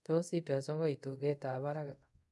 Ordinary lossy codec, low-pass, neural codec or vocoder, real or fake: none; none; codec, 24 kHz, 0.5 kbps, DualCodec; fake